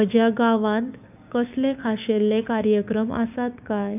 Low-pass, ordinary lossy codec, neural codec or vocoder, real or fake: 3.6 kHz; none; codec, 16 kHz, 6 kbps, DAC; fake